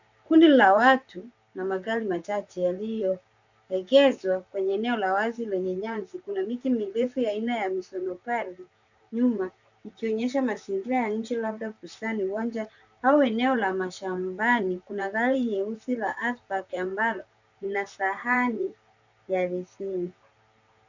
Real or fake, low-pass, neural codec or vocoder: fake; 7.2 kHz; vocoder, 44.1 kHz, 128 mel bands, Pupu-Vocoder